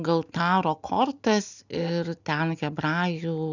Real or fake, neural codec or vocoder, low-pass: real; none; 7.2 kHz